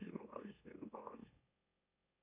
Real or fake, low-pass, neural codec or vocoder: fake; 3.6 kHz; autoencoder, 44.1 kHz, a latent of 192 numbers a frame, MeloTTS